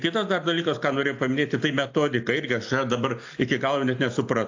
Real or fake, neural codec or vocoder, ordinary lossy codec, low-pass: real; none; AAC, 48 kbps; 7.2 kHz